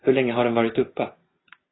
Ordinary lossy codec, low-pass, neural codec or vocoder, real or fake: AAC, 16 kbps; 7.2 kHz; none; real